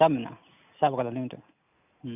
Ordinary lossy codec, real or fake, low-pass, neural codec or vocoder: none; real; 3.6 kHz; none